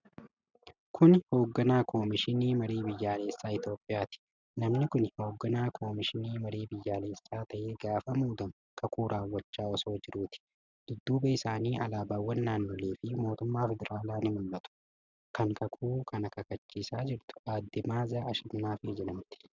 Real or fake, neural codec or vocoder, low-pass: real; none; 7.2 kHz